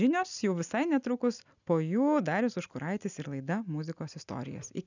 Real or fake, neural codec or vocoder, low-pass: real; none; 7.2 kHz